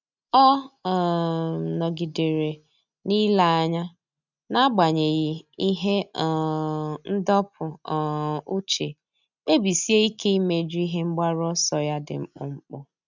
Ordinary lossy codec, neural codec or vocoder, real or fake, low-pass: none; none; real; 7.2 kHz